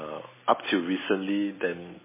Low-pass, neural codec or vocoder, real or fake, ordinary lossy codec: 3.6 kHz; none; real; MP3, 16 kbps